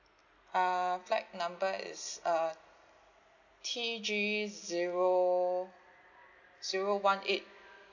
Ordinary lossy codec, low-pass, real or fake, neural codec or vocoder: none; 7.2 kHz; real; none